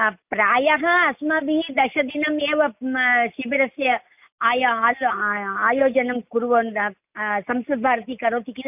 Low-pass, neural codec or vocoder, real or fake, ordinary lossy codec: 3.6 kHz; none; real; none